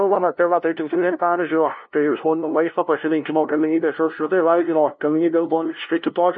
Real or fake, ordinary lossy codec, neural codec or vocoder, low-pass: fake; MP3, 32 kbps; codec, 16 kHz, 0.5 kbps, FunCodec, trained on LibriTTS, 25 frames a second; 7.2 kHz